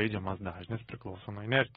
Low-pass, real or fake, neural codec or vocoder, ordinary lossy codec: 19.8 kHz; fake; autoencoder, 48 kHz, 128 numbers a frame, DAC-VAE, trained on Japanese speech; AAC, 16 kbps